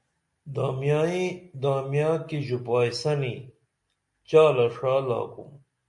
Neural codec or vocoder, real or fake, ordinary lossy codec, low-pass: none; real; MP3, 48 kbps; 10.8 kHz